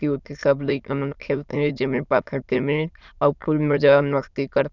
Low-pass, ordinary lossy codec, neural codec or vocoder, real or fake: 7.2 kHz; none; autoencoder, 22.05 kHz, a latent of 192 numbers a frame, VITS, trained on many speakers; fake